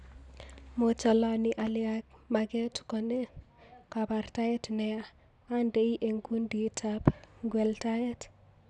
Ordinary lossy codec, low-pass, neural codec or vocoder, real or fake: none; 10.8 kHz; none; real